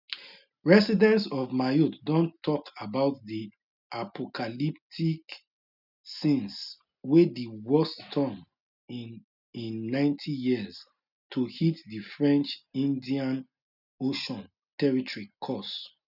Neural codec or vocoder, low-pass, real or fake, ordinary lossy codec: none; 5.4 kHz; real; none